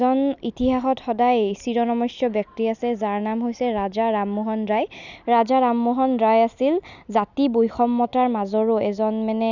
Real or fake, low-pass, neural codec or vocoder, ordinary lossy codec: real; 7.2 kHz; none; none